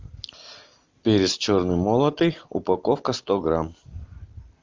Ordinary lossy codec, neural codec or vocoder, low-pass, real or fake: Opus, 32 kbps; none; 7.2 kHz; real